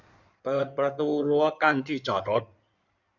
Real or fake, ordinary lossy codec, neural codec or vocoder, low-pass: fake; none; codec, 16 kHz in and 24 kHz out, 2.2 kbps, FireRedTTS-2 codec; 7.2 kHz